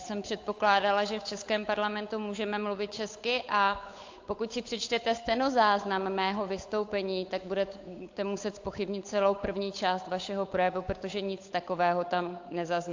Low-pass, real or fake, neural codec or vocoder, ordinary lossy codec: 7.2 kHz; fake; codec, 16 kHz, 8 kbps, FunCodec, trained on Chinese and English, 25 frames a second; AAC, 48 kbps